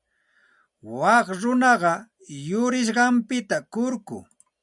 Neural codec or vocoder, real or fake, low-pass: none; real; 10.8 kHz